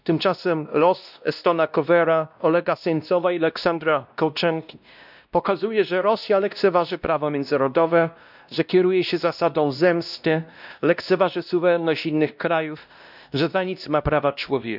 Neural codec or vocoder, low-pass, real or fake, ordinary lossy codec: codec, 16 kHz, 1 kbps, X-Codec, WavLM features, trained on Multilingual LibriSpeech; 5.4 kHz; fake; none